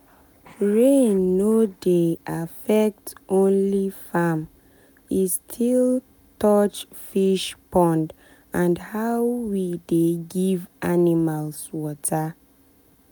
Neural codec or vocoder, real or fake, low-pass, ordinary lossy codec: none; real; none; none